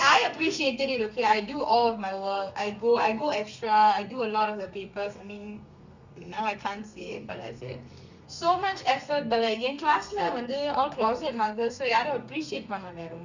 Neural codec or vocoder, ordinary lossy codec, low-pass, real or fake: codec, 32 kHz, 1.9 kbps, SNAC; Opus, 64 kbps; 7.2 kHz; fake